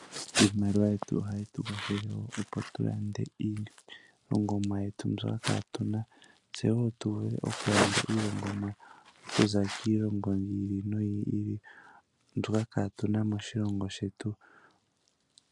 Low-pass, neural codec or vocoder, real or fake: 10.8 kHz; none; real